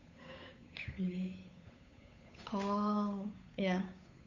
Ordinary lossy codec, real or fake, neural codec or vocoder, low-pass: none; fake; codec, 16 kHz, 8 kbps, FunCodec, trained on Chinese and English, 25 frames a second; 7.2 kHz